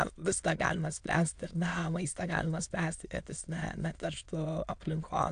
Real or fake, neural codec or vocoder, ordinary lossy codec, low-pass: fake; autoencoder, 22.05 kHz, a latent of 192 numbers a frame, VITS, trained on many speakers; AAC, 64 kbps; 9.9 kHz